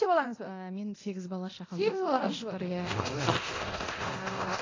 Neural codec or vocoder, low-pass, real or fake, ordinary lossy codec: codec, 24 kHz, 0.9 kbps, DualCodec; 7.2 kHz; fake; AAC, 32 kbps